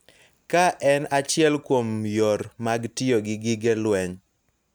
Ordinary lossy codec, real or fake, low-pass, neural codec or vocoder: none; real; none; none